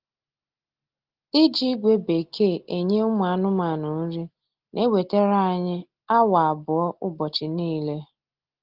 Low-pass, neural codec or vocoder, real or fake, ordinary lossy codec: 5.4 kHz; none; real; Opus, 16 kbps